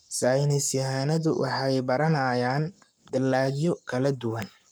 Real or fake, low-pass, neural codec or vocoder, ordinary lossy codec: fake; none; codec, 44.1 kHz, 7.8 kbps, Pupu-Codec; none